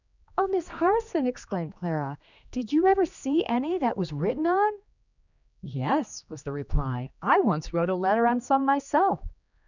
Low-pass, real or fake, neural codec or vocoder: 7.2 kHz; fake; codec, 16 kHz, 2 kbps, X-Codec, HuBERT features, trained on general audio